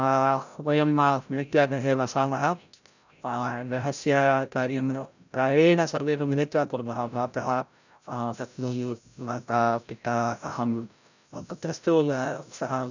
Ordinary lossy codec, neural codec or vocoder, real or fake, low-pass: none; codec, 16 kHz, 0.5 kbps, FreqCodec, larger model; fake; 7.2 kHz